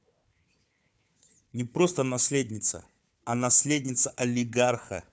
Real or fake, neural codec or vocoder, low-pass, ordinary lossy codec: fake; codec, 16 kHz, 4 kbps, FunCodec, trained on Chinese and English, 50 frames a second; none; none